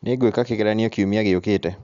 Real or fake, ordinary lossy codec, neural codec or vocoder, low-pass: real; Opus, 64 kbps; none; 7.2 kHz